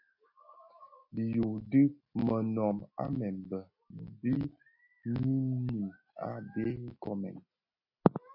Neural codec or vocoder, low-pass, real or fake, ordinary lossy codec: none; 5.4 kHz; real; MP3, 32 kbps